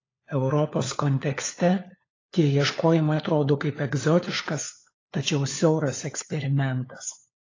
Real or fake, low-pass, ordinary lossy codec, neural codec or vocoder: fake; 7.2 kHz; AAC, 32 kbps; codec, 16 kHz, 4 kbps, FunCodec, trained on LibriTTS, 50 frames a second